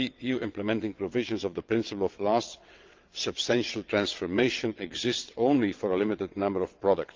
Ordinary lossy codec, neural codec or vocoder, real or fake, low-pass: Opus, 24 kbps; vocoder, 22.05 kHz, 80 mel bands, WaveNeXt; fake; 7.2 kHz